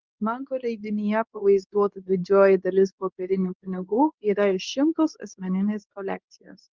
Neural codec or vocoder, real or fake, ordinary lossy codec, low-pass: codec, 24 kHz, 0.9 kbps, WavTokenizer, medium speech release version 2; fake; Opus, 24 kbps; 7.2 kHz